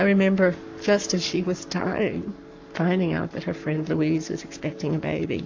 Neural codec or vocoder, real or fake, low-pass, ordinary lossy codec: codec, 44.1 kHz, 7.8 kbps, Pupu-Codec; fake; 7.2 kHz; AAC, 48 kbps